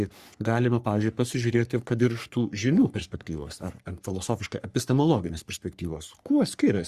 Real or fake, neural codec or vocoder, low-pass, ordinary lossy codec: fake; codec, 44.1 kHz, 3.4 kbps, Pupu-Codec; 14.4 kHz; Opus, 64 kbps